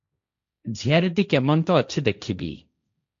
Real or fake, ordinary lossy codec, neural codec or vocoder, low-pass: fake; AAC, 64 kbps; codec, 16 kHz, 1.1 kbps, Voila-Tokenizer; 7.2 kHz